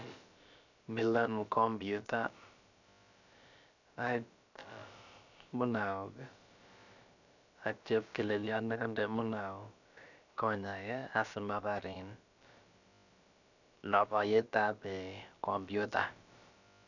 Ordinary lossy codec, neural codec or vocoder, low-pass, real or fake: none; codec, 16 kHz, about 1 kbps, DyCAST, with the encoder's durations; 7.2 kHz; fake